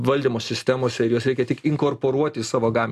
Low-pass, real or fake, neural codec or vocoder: 14.4 kHz; real; none